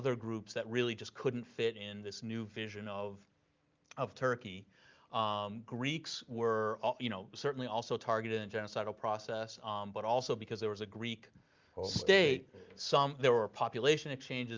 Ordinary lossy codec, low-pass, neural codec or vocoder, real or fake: Opus, 24 kbps; 7.2 kHz; none; real